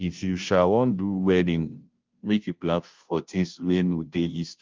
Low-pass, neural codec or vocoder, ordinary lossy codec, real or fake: 7.2 kHz; codec, 16 kHz, 0.5 kbps, FunCodec, trained on Chinese and English, 25 frames a second; Opus, 32 kbps; fake